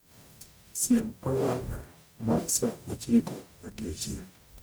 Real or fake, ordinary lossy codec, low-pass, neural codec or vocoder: fake; none; none; codec, 44.1 kHz, 0.9 kbps, DAC